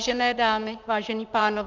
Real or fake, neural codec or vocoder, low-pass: fake; vocoder, 44.1 kHz, 128 mel bands, Pupu-Vocoder; 7.2 kHz